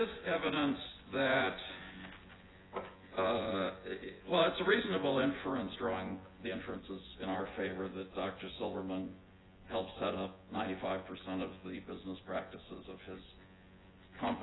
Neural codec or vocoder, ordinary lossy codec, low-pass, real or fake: vocoder, 24 kHz, 100 mel bands, Vocos; AAC, 16 kbps; 7.2 kHz; fake